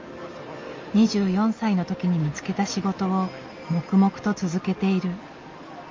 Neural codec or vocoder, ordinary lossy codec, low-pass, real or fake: none; Opus, 32 kbps; 7.2 kHz; real